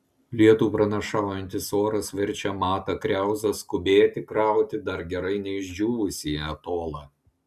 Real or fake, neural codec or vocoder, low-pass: real; none; 14.4 kHz